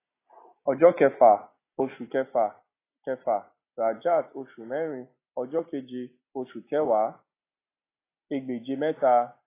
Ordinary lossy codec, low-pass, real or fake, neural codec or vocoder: AAC, 24 kbps; 3.6 kHz; real; none